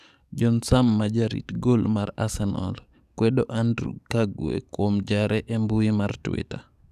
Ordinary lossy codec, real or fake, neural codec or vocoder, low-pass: none; fake; codec, 44.1 kHz, 7.8 kbps, DAC; 14.4 kHz